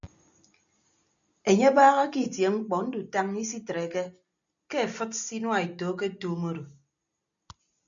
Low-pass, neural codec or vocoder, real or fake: 7.2 kHz; none; real